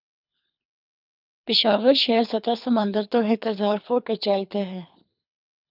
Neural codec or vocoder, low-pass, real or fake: codec, 24 kHz, 3 kbps, HILCodec; 5.4 kHz; fake